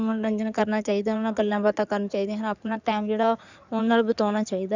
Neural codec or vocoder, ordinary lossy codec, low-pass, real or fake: codec, 16 kHz in and 24 kHz out, 2.2 kbps, FireRedTTS-2 codec; none; 7.2 kHz; fake